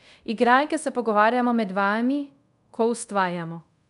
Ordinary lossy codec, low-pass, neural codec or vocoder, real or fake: MP3, 96 kbps; 10.8 kHz; codec, 24 kHz, 0.5 kbps, DualCodec; fake